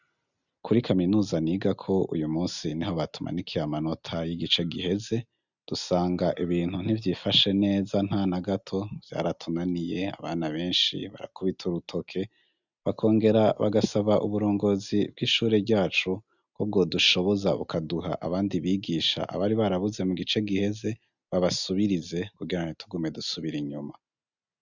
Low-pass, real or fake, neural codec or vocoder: 7.2 kHz; real; none